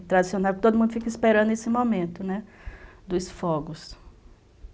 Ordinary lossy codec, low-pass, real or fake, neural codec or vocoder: none; none; real; none